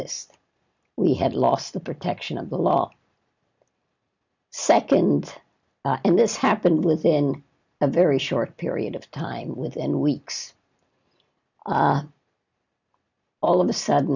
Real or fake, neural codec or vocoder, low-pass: real; none; 7.2 kHz